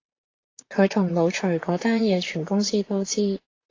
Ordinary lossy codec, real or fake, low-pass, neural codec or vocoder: AAC, 32 kbps; fake; 7.2 kHz; vocoder, 44.1 kHz, 128 mel bands, Pupu-Vocoder